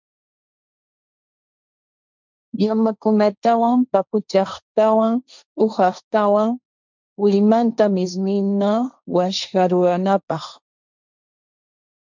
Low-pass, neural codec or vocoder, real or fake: 7.2 kHz; codec, 16 kHz, 1.1 kbps, Voila-Tokenizer; fake